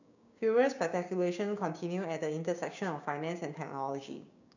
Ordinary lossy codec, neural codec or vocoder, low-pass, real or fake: none; codec, 16 kHz, 6 kbps, DAC; 7.2 kHz; fake